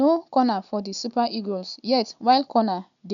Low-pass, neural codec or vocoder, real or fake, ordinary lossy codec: 7.2 kHz; none; real; none